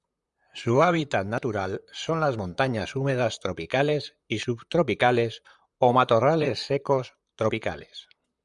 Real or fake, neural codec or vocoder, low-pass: fake; vocoder, 44.1 kHz, 128 mel bands, Pupu-Vocoder; 10.8 kHz